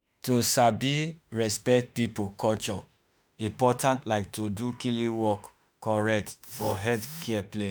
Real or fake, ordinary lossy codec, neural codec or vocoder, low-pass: fake; none; autoencoder, 48 kHz, 32 numbers a frame, DAC-VAE, trained on Japanese speech; none